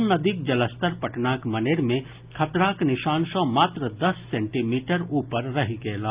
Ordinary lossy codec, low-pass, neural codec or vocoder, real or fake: Opus, 24 kbps; 3.6 kHz; none; real